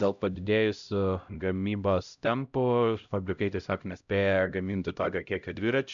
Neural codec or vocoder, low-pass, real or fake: codec, 16 kHz, 0.5 kbps, X-Codec, HuBERT features, trained on LibriSpeech; 7.2 kHz; fake